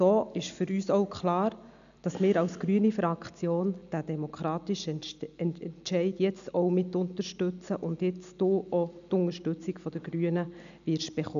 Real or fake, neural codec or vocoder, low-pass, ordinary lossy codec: real; none; 7.2 kHz; none